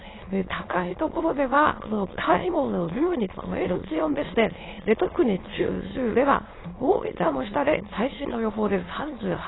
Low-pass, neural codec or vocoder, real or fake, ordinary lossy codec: 7.2 kHz; autoencoder, 22.05 kHz, a latent of 192 numbers a frame, VITS, trained on many speakers; fake; AAC, 16 kbps